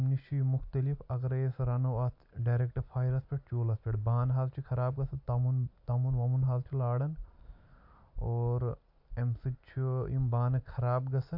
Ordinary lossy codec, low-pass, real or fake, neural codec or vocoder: AAC, 48 kbps; 5.4 kHz; real; none